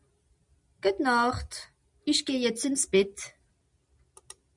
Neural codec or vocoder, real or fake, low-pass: none; real; 10.8 kHz